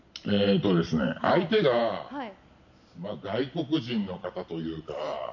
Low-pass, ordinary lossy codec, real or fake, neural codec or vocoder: 7.2 kHz; none; real; none